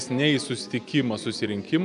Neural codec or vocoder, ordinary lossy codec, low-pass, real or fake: none; MP3, 96 kbps; 10.8 kHz; real